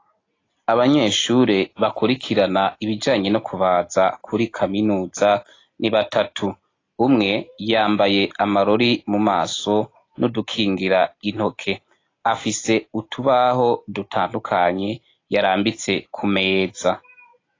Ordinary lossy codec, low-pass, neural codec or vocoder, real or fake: AAC, 32 kbps; 7.2 kHz; none; real